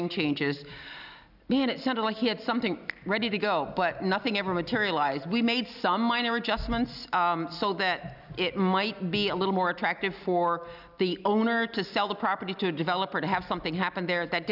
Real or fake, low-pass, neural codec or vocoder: real; 5.4 kHz; none